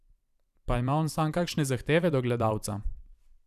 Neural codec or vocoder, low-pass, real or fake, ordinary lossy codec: vocoder, 44.1 kHz, 128 mel bands every 512 samples, BigVGAN v2; 14.4 kHz; fake; none